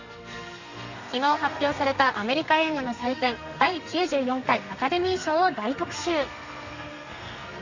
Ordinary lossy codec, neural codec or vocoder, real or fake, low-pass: Opus, 64 kbps; codec, 32 kHz, 1.9 kbps, SNAC; fake; 7.2 kHz